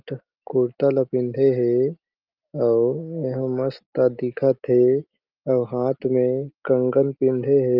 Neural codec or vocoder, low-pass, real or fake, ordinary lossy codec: none; 5.4 kHz; real; Opus, 24 kbps